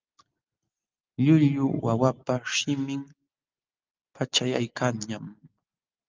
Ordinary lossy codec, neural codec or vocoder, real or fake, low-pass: Opus, 24 kbps; none; real; 7.2 kHz